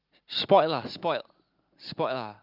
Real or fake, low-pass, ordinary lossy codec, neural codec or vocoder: real; 5.4 kHz; Opus, 24 kbps; none